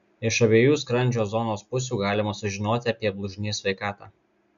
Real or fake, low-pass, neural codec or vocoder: real; 7.2 kHz; none